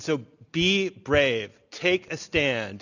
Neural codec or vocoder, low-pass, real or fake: none; 7.2 kHz; real